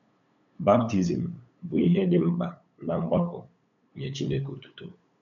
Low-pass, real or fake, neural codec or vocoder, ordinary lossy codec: 7.2 kHz; fake; codec, 16 kHz, 8 kbps, FunCodec, trained on LibriTTS, 25 frames a second; MP3, 64 kbps